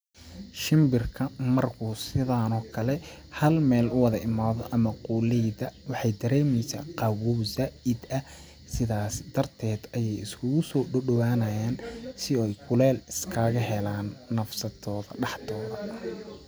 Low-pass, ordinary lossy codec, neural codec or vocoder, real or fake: none; none; none; real